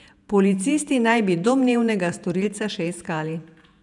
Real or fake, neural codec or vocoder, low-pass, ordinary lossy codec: fake; vocoder, 48 kHz, 128 mel bands, Vocos; 10.8 kHz; none